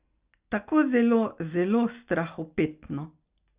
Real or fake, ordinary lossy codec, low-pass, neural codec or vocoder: real; Opus, 64 kbps; 3.6 kHz; none